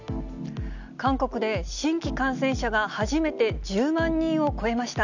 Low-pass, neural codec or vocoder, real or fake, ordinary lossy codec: 7.2 kHz; none; real; none